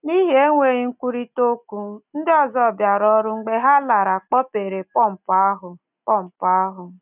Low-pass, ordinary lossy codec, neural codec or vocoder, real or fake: 3.6 kHz; none; none; real